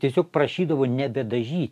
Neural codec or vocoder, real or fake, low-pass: none; real; 14.4 kHz